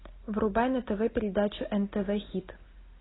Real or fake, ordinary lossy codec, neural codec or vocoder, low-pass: real; AAC, 16 kbps; none; 7.2 kHz